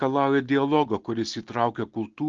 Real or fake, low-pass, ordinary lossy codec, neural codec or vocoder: real; 7.2 kHz; Opus, 16 kbps; none